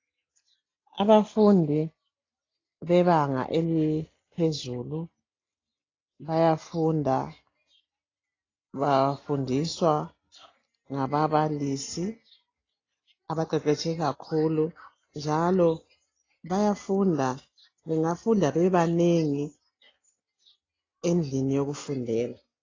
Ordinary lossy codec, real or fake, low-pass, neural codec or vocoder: AAC, 32 kbps; real; 7.2 kHz; none